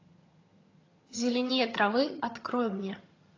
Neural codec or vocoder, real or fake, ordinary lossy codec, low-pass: vocoder, 22.05 kHz, 80 mel bands, HiFi-GAN; fake; AAC, 32 kbps; 7.2 kHz